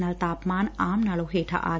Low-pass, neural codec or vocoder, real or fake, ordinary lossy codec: none; none; real; none